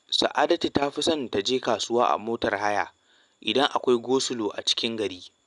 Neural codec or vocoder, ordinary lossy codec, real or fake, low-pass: none; none; real; 10.8 kHz